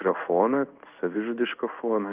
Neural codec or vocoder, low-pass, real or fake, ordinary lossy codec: codec, 16 kHz in and 24 kHz out, 1 kbps, XY-Tokenizer; 3.6 kHz; fake; Opus, 24 kbps